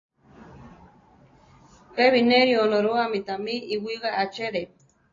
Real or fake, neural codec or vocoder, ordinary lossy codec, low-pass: real; none; AAC, 32 kbps; 7.2 kHz